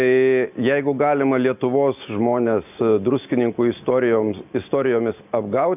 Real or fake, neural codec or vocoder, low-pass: real; none; 3.6 kHz